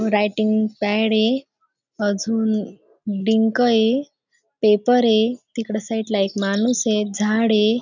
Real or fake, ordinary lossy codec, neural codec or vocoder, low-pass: real; none; none; 7.2 kHz